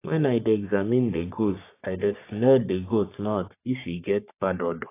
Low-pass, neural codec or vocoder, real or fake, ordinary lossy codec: 3.6 kHz; codec, 44.1 kHz, 3.4 kbps, Pupu-Codec; fake; AAC, 24 kbps